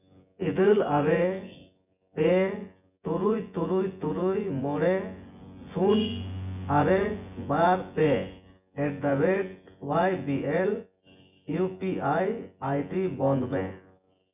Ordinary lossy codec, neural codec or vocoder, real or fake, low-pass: none; vocoder, 24 kHz, 100 mel bands, Vocos; fake; 3.6 kHz